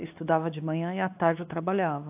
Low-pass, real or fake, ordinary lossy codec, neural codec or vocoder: 3.6 kHz; fake; AAC, 32 kbps; codec, 16 kHz, 2 kbps, X-Codec, WavLM features, trained on Multilingual LibriSpeech